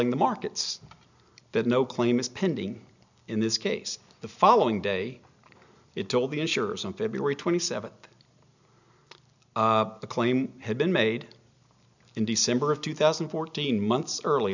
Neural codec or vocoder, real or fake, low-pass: none; real; 7.2 kHz